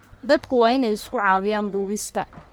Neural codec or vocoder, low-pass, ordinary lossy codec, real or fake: codec, 44.1 kHz, 1.7 kbps, Pupu-Codec; none; none; fake